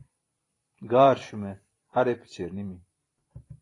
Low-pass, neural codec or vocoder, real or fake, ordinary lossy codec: 10.8 kHz; vocoder, 44.1 kHz, 128 mel bands every 512 samples, BigVGAN v2; fake; AAC, 32 kbps